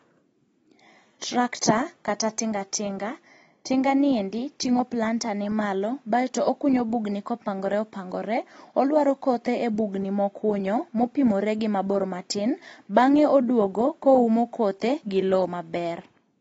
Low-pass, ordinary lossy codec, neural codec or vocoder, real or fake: 10.8 kHz; AAC, 24 kbps; none; real